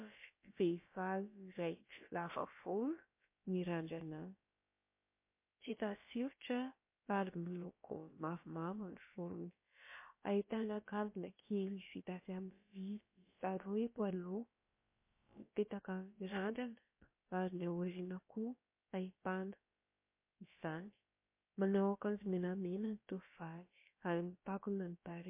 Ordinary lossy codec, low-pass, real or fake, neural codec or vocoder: MP3, 24 kbps; 3.6 kHz; fake; codec, 16 kHz, about 1 kbps, DyCAST, with the encoder's durations